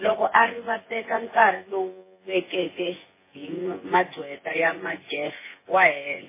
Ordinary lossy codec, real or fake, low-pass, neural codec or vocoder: MP3, 16 kbps; fake; 3.6 kHz; vocoder, 24 kHz, 100 mel bands, Vocos